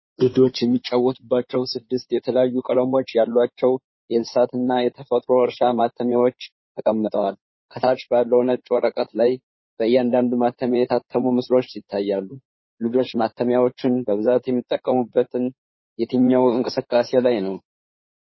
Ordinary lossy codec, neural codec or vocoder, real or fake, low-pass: MP3, 24 kbps; codec, 16 kHz in and 24 kHz out, 2.2 kbps, FireRedTTS-2 codec; fake; 7.2 kHz